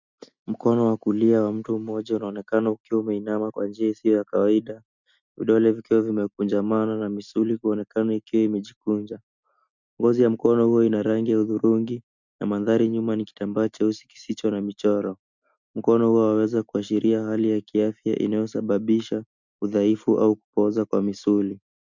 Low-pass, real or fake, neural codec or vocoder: 7.2 kHz; real; none